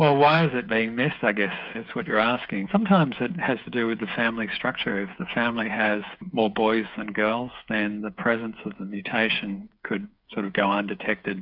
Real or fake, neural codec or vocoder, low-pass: fake; codec, 16 kHz, 8 kbps, FreqCodec, smaller model; 5.4 kHz